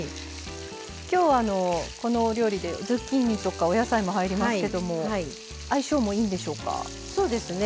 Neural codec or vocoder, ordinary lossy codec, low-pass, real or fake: none; none; none; real